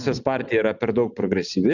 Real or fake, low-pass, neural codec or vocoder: real; 7.2 kHz; none